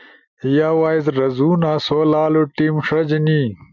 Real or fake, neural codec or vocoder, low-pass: real; none; 7.2 kHz